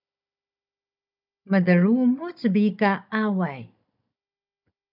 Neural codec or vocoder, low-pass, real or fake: codec, 16 kHz, 16 kbps, FunCodec, trained on Chinese and English, 50 frames a second; 5.4 kHz; fake